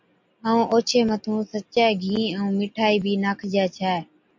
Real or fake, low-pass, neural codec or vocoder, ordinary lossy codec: real; 7.2 kHz; none; MP3, 48 kbps